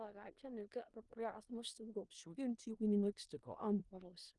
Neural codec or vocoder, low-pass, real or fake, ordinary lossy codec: codec, 16 kHz in and 24 kHz out, 0.4 kbps, LongCat-Audio-Codec, four codebook decoder; 10.8 kHz; fake; Opus, 32 kbps